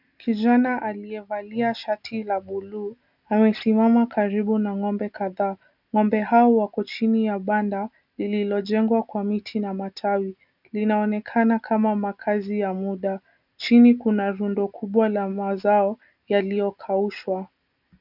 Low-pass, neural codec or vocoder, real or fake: 5.4 kHz; none; real